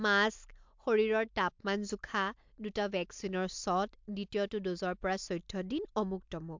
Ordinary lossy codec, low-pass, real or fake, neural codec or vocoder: MP3, 64 kbps; 7.2 kHz; real; none